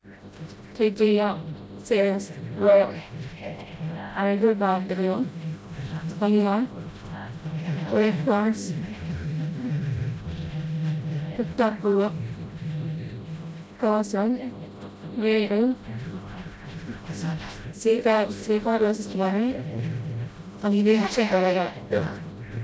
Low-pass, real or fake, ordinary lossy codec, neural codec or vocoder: none; fake; none; codec, 16 kHz, 0.5 kbps, FreqCodec, smaller model